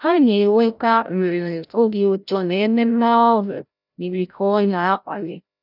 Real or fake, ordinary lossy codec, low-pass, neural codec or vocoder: fake; none; 5.4 kHz; codec, 16 kHz, 0.5 kbps, FreqCodec, larger model